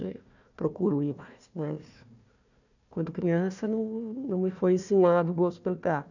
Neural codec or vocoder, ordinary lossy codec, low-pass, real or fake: codec, 16 kHz, 1 kbps, FunCodec, trained on Chinese and English, 50 frames a second; none; 7.2 kHz; fake